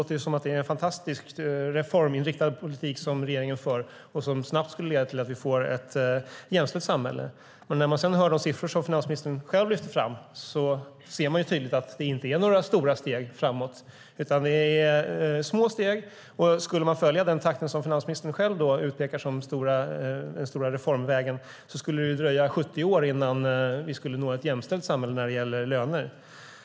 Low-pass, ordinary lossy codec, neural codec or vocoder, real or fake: none; none; none; real